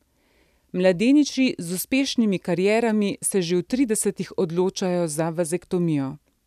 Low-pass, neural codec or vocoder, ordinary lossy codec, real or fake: 14.4 kHz; none; none; real